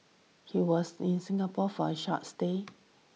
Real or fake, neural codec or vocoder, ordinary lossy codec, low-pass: real; none; none; none